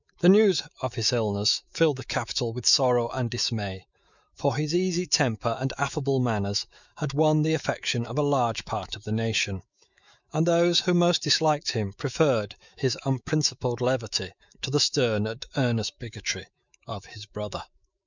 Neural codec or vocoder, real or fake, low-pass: autoencoder, 48 kHz, 128 numbers a frame, DAC-VAE, trained on Japanese speech; fake; 7.2 kHz